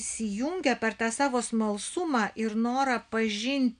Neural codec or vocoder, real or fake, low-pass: none; real; 9.9 kHz